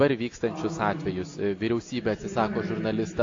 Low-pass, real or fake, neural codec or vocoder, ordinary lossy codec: 7.2 kHz; real; none; AAC, 48 kbps